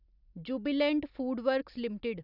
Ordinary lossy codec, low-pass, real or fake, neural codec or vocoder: none; 5.4 kHz; real; none